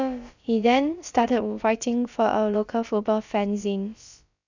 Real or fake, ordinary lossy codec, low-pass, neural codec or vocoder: fake; none; 7.2 kHz; codec, 16 kHz, about 1 kbps, DyCAST, with the encoder's durations